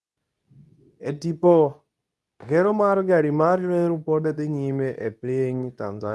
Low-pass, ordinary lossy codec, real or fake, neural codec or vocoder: none; none; fake; codec, 24 kHz, 0.9 kbps, WavTokenizer, medium speech release version 2